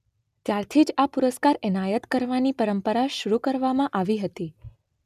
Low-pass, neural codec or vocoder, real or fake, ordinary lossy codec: 14.4 kHz; none; real; none